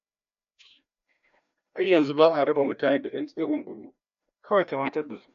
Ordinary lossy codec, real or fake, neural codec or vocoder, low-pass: MP3, 64 kbps; fake; codec, 16 kHz, 1 kbps, FreqCodec, larger model; 7.2 kHz